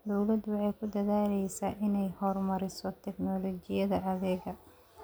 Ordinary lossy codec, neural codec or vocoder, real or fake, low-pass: none; none; real; none